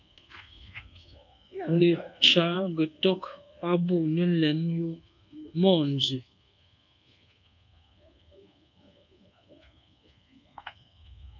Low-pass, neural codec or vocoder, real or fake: 7.2 kHz; codec, 24 kHz, 1.2 kbps, DualCodec; fake